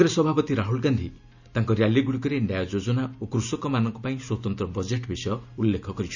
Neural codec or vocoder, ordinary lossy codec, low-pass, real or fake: none; none; 7.2 kHz; real